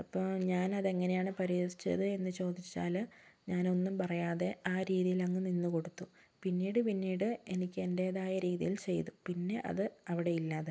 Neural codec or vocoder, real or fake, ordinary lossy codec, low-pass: none; real; none; none